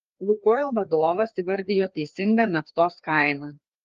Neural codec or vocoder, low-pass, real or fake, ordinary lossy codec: codec, 16 kHz, 2 kbps, FreqCodec, larger model; 7.2 kHz; fake; Opus, 32 kbps